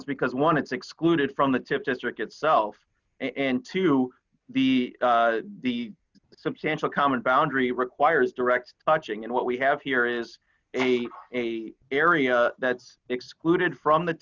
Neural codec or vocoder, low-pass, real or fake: none; 7.2 kHz; real